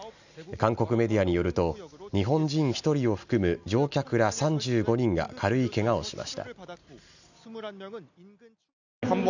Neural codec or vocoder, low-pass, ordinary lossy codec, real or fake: none; 7.2 kHz; none; real